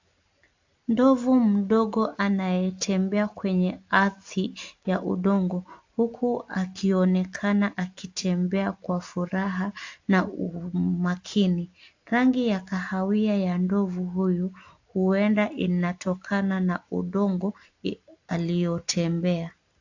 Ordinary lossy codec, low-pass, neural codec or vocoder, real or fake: AAC, 48 kbps; 7.2 kHz; none; real